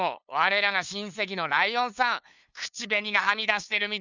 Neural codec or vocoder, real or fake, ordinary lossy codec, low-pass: codec, 16 kHz, 2 kbps, FunCodec, trained on LibriTTS, 25 frames a second; fake; none; 7.2 kHz